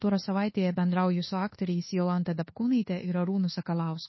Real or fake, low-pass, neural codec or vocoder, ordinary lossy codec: fake; 7.2 kHz; codec, 24 kHz, 1.2 kbps, DualCodec; MP3, 24 kbps